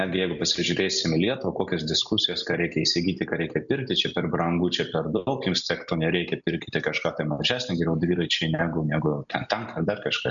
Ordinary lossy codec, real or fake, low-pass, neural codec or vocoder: MP3, 96 kbps; real; 7.2 kHz; none